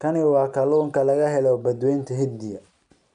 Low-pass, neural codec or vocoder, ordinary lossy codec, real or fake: 9.9 kHz; none; none; real